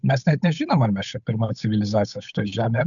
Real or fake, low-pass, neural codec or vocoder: fake; 7.2 kHz; codec, 16 kHz, 8 kbps, FunCodec, trained on Chinese and English, 25 frames a second